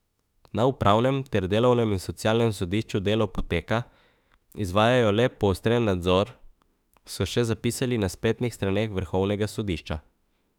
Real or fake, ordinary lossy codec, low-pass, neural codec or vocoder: fake; none; 19.8 kHz; autoencoder, 48 kHz, 32 numbers a frame, DAC-VAE, trained on Japanese speech